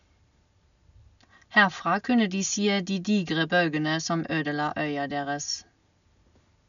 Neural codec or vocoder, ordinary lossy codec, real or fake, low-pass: none; none; real; 7.2 kHz